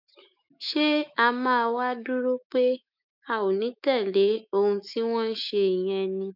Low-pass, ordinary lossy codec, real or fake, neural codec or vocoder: 5.4 kHz; none; fake; autoencoder, 48 kHz, 128 numbers a frame, DAC-VAE, trained on Japanese speech